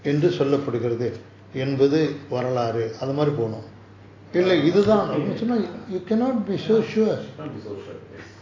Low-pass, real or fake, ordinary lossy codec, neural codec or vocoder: 7.2 kHz; real; AAC, 32 kbps; none